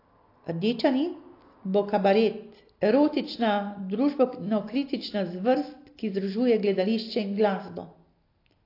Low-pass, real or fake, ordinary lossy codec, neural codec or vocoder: 5.4 kHz; real; AAC, 32 kbps; none